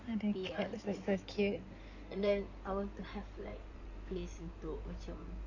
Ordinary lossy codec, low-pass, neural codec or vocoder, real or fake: none; 7.2 kHz; codec, 16 kHz in and 24 kHz out, 2.2 kbps, FireRedTTS-2 codec; fake